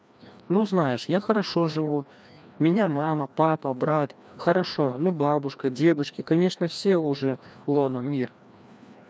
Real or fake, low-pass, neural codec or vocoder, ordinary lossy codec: fake; none; codec, 16 kHz, 1 kbps, FreqCodec, larger model; none